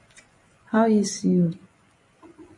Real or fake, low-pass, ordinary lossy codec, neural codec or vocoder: fake; 10.8 kHz; MP3, 48 kbps; vocoder, 24 kHz, 100 mel bands, Vocos